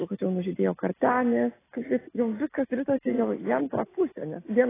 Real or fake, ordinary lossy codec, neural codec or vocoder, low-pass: real; AAC, 16 kbps; none; 3.6 kHz